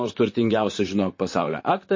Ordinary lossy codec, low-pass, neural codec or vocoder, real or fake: MP3, 32 kbps; 7.2 kHz; vocoder, 44.1 kHz, 128 mel bands, Pupu-Vocoder; fake